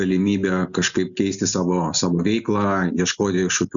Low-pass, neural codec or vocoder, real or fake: 7.2 kHz; none; real